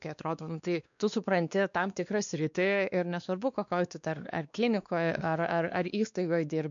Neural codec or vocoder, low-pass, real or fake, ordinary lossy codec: codec, 16 kHz, 2 kbps, X-Codec, WavLM features, trained on Multilingual LibriSpeech; 7.2 kHz; fake; MP3, 96 kbps